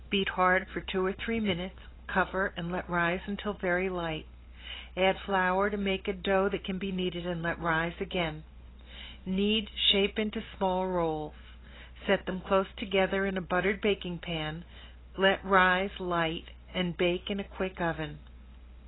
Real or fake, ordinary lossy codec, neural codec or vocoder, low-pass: real; AAC, 16 kbps; none; 7.2 kHz